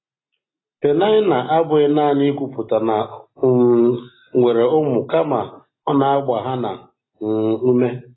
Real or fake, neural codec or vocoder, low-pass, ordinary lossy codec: real; none; 7.2 kHz; AAC, 16 kbps